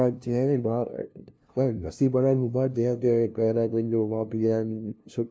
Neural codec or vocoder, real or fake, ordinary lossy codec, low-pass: codec, 16 kHz, 0.5 kbps, FunCodec, trained on LibriTTS, 25 frames a second; fake; none; none